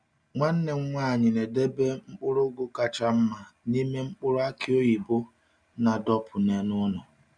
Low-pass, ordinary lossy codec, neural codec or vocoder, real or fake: 9.9 kHz; none; none; real